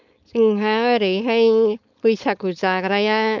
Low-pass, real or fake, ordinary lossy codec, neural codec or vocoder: 7.2 kHz; fake; none; codec, 16 kHz, 4.8 kbps, FACodec